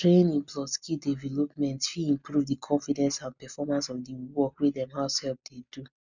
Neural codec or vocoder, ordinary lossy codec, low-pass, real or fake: none; none; 7.2 kHz; real